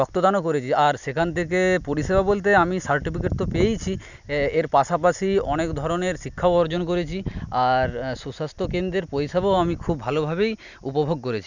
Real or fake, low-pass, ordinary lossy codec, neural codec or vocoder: real; 7.2 kHz; none; none